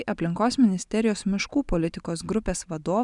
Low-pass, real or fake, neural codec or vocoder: 10.8 kHz; real; none